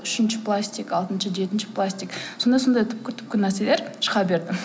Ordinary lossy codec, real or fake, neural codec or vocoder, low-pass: none; real; none; none